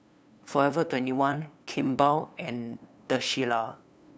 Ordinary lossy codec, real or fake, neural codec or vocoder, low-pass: none; fake; codec, 16 kHz, 2 kbps, FunCodec, trained on LibriTTS, 25 frames a second; none